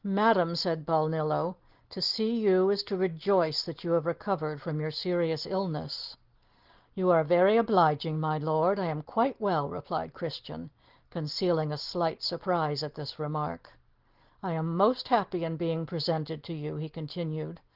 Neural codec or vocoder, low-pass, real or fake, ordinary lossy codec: none; 5.4 kHz; real; Opus, 16 kbps